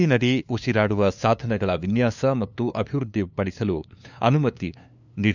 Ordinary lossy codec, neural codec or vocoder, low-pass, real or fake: none; codec, 16 kHz, 2 kbps, FunCodec, trained on LibriTTS, 25 frames a second; 7.2 kHz; fake